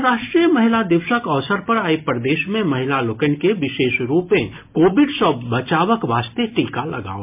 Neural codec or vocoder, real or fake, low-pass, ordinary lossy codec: none; real; 3.6 kHz; MP3, 32 kbps